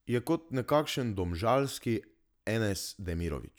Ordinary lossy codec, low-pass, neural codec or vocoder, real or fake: none; none; none; real